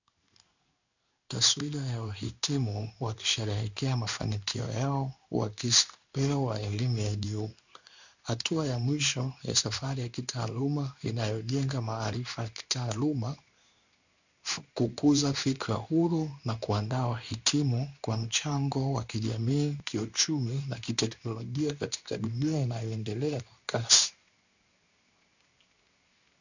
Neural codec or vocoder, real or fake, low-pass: codec, 16 kHz in and 24 kHz out, 1 kbps, XY-Tokenizer; fake; 7.2 kHz